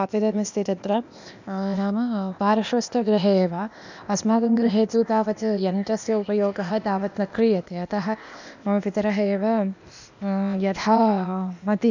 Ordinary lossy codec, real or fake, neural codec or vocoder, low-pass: none; fake; codec, 16 kHz, 0.8 kbps, ZipCodec; 7.2 kHz